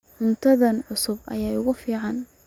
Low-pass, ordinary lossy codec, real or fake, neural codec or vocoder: 19.8 kHz; none; real; none